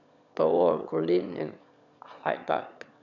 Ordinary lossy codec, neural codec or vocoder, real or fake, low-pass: none; autoencoder, 22.05 kHz, a latent of 192 numbers a frame, VITS, trained on one speaker; fake; 7.2 kHz